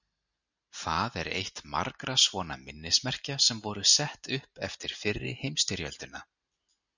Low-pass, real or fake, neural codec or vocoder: 7.2 kHz; real; none